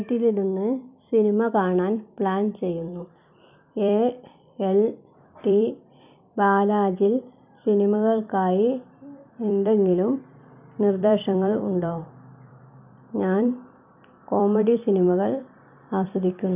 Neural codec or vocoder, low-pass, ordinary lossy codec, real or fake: vocoder, 44.1 kHz, 128 mel bands every 256 samples, BigVGAN v2; 3.6 kHz; none; fake